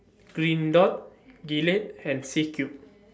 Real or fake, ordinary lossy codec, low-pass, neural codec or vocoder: real; none; none; none